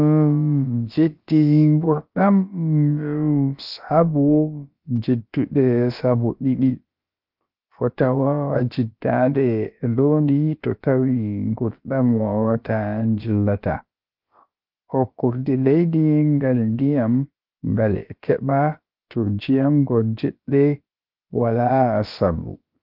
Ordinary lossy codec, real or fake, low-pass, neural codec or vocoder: Opus, 32 kbps; fake; 5.4 kHz; codec, 16 kHz, about 1 kbps, DyCAST, with the encoder's durations